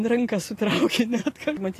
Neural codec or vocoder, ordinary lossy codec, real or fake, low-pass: vocoder, 44.1 kHz, 128 mel bands every 512 samples, BigVGAN v2; AAC, 48 kbps; fake; 14.4 kHz